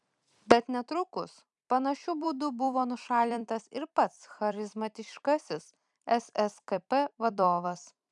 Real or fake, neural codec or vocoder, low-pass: fake; vocoder, 44.1 kHz, 128 mel bands every 256 samples, BigVGAN v2; 10.8 kHz